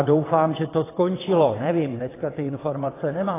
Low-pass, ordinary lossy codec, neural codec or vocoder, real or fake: 3.6 kHz; AAC, 16 kbps; vocoder, 22.05 kHz, 80 mel bands, WaveNeXt; fake